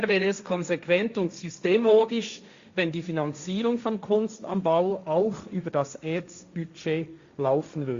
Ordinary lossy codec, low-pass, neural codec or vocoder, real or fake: Opus, 64 kbps; 7.2 kHz; codec, 16 kHz, 1.1 kbps, Voila-Tokenizer; fake